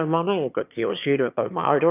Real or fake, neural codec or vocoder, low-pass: fake; autoencoder, 22.05 kHz, a latent of 192 numbers a frame, VITS, trained on one speaker; 3.6 kHz